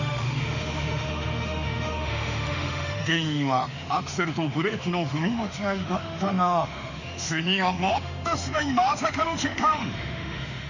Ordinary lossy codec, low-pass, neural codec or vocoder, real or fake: none; 7.2 kHz; autoencoder, 48 kHz, 32 numbers a frame, DAC-VAE, trained on Japanese speech; fake